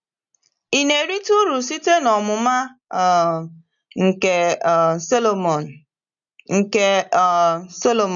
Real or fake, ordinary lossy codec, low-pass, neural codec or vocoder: real; none; 7.2 kHz; none